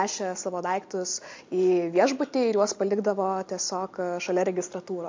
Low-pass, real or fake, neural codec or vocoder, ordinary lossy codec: 7.2 kHz; real; none; MP3, 48 kbps